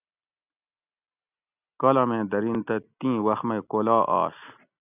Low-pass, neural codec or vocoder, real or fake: 3.6 kHz; none; real